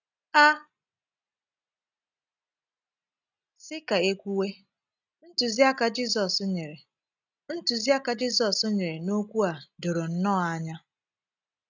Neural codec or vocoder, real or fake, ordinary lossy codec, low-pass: none; real; none; 7.2 kHz